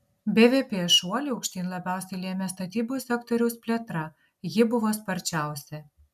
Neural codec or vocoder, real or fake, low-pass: none; real; 14.4 kHz